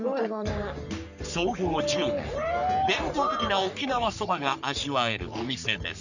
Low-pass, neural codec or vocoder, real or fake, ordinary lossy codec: 7.2 kHz; codec, 44.1 kHz, 3.4 kbps, Pupu-Codec; fake; none